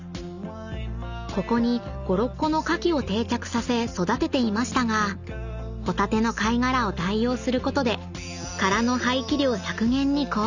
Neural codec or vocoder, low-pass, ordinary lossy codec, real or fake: none; 7.2 kHz; none; real